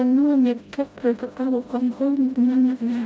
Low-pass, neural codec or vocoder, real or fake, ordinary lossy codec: none; codec, 16 kHz, 0.5 kbps, FreqCodec, smaller model; fake; none